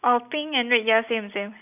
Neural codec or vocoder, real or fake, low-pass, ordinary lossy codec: none; real; 3.6 kHz; none